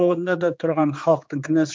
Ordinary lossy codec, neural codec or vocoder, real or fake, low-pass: none; codec, 16 kHz, 4 kbps, X-Codec, HuBERT features, trained on general audio; fake; none